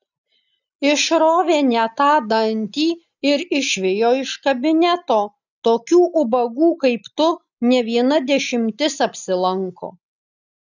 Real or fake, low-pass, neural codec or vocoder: real; 7.2 kHz; none